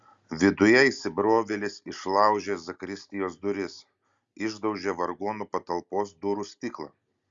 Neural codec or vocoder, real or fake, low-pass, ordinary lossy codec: none; real; 7.2 kHz; Opus, 64 kbps